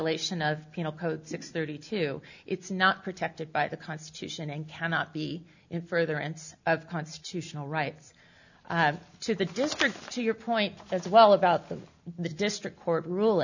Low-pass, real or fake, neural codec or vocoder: 7.2 kHz; real; none